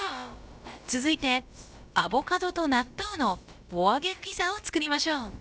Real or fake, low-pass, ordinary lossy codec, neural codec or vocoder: fake; none; none; codec, 16 kHz, about 1 kbps, DyCAST, with the encoder's durations